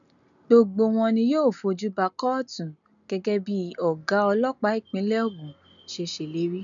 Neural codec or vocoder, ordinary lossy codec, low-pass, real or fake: none; none; 7.2 kHz; real